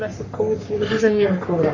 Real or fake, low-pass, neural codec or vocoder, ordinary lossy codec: fake; 7.2 kHz; codec, 44.1 kHz, 3.4 kbps, Pupu-Codec; AAC, 48 kbps